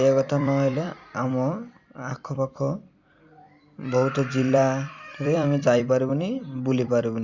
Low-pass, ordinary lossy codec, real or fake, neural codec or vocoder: 7.2 kHz; Opus, 64 kbps; fake; vocoder, 44.1 kHz, 128 mel bands every 256 samples, BigVGAN v2